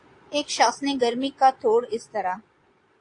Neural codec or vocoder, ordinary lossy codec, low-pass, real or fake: vocoder, 22.05 kHz, 80 mel bands, Vocos; AAC, 48 kbps; 9.9 kHz; fake